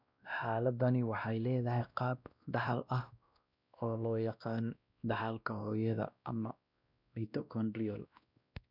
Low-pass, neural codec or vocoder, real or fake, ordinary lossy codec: 5.4 kHz; codec, 16 kHz, 1 kbps, X-Codec, WavLM features, trained on Multilingual LibriSpeech; fake; none